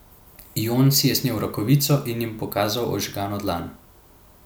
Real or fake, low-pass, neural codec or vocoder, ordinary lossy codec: real; none; none; none